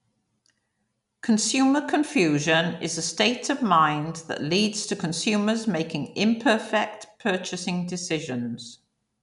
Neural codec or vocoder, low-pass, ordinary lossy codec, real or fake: none; 10.8 kHz; none; real